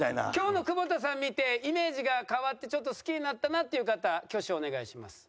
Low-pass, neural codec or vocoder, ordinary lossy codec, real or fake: none; none; none; real